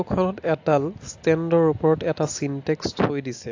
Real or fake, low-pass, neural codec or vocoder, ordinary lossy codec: real; 7.2 kHz; none; AAC, 48 kbps